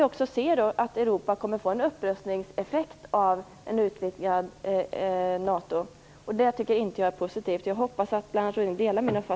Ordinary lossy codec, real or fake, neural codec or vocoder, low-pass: none; real; none; none